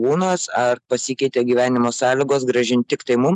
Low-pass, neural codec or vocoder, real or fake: 10.8 kHz; none; real